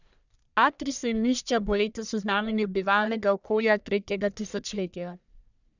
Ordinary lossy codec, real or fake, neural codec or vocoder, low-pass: none; fake; codec, 44.1 kHz, 1.7 kbps, Pupu-Codec; 7.2 kHz